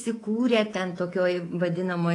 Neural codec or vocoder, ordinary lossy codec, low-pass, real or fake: codec, 24 kHz, 3.1 kbps, DualCodec; AAC, 32 kbps; 10.8 kHz; fake